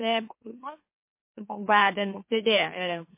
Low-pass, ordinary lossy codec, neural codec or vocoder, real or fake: 3.6 kHz; MP3, 24 kbps; autoencoder, 44.1 kHz, a latent of 192 numbers a frame, MeloTTS; fake